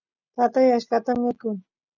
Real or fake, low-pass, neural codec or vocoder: real; 7.2 kHz; none